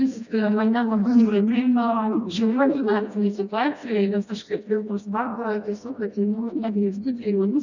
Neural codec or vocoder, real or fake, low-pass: codec, 16 kHz, 1 kbps, FreqCodec, smaller model; fake; 7.2 kHz